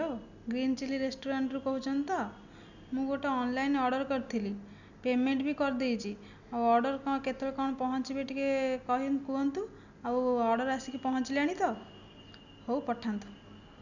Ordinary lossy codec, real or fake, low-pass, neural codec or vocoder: none; real; 7.2 kHz; none